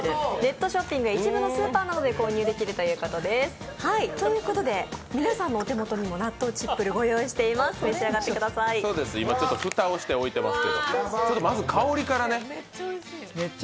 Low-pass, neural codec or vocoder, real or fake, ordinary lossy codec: none; none; real; none